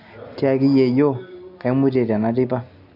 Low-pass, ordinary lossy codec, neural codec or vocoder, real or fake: 5.4 kHz; none; none; real